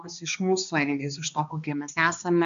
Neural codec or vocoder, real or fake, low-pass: codec, 16 kHz, 2 kbps, X-Codec, HuBERT features, trained on balanced general audio; fake; 7.2 kHz